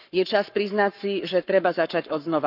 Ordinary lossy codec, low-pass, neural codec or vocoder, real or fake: none; 5.4 kHz; codec, 44.1 kHz, 7.8 kbps, Pupu-Codec; fake